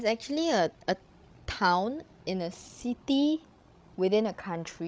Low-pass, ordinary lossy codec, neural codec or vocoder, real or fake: none; none; codec, 16 kHz, 16 kbps, FreqCodec, larger model; fake